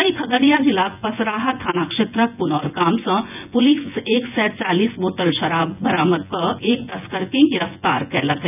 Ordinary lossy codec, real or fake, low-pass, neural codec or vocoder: none; fake; 3.6 kHz; vocoder, 24 kHz, 100 mel bands, Vocos